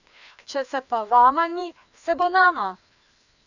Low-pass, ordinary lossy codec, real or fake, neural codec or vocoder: 7.2 kHz; none; fake; codec, 16 kHz, 2 kbps, FreqCodec, larger model